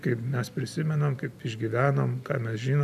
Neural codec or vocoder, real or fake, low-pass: none; real; 14.4 kHz